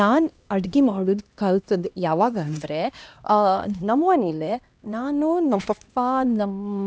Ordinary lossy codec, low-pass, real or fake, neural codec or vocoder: none; none; fake; codec, 16 kHz, 1 kbps, X-Codec, HuBERT features, trained on LibriSpeech